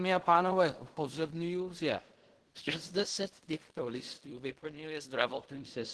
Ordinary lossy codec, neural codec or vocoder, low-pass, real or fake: Opus, 16 kbps; codec, 16 kHz in and 24 kHz out, 0.4 kbps, LongCat-Audio-Codec, fine tuned four codebook decoder; 10.8 kHz; fake